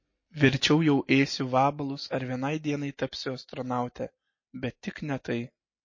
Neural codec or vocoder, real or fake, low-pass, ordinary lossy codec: none; real; 7.2 kHz; MP3, 32 kbps